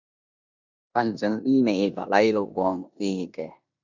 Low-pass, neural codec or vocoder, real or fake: 7.2 kHz; codec, 16 kHz in and 24 kHz out, 0.9 kbps, LongCat-Audio-Codec, four codebook decoder; fake